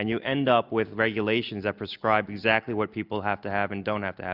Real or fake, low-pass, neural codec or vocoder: real; 5.4 kHz; none